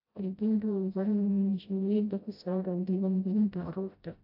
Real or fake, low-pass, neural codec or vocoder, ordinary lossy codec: fake; 5.4 kHz; codec, 16 kHz, 0.5 kbps, FreqCodec, smaller model; AAC, 48 kbps